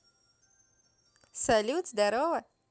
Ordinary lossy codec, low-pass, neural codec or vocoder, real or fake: none; none; none; real